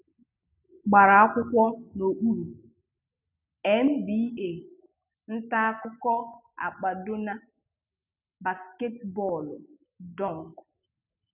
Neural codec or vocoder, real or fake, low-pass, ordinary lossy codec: none; real; 3.6 kHz; none